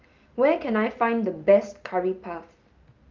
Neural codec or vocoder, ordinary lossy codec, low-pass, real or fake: none; Opus, 32 kbps; 7.2 kHz; real